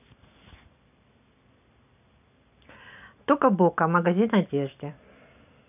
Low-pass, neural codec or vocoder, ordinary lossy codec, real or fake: 3.6 kHz; none; none; real